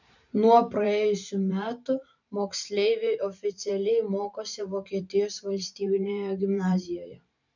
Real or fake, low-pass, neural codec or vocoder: fake; 7.2 kHz; vocoder, 44.1 kHz, 128 mel bands every 512 samples, BigVGAN v2